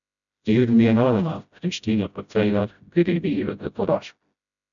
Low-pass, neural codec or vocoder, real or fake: 7.2 kHz; codec, 16 kHz, 0.5 kbps, FreqCodec, smaller model; fake